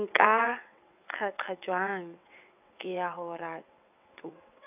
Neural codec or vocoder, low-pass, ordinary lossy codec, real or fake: vocoder, 22.05 kHz, 80 mel bands, WaveNeXt; 3.6 kHz; none; fake